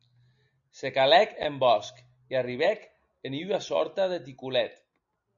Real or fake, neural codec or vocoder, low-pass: real; none; 7.2 kHz